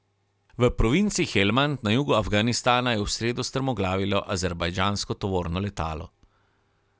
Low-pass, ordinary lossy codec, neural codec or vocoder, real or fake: none; none; none; real